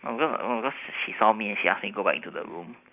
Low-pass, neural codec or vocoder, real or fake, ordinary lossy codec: 3.6 kHz; none; real; none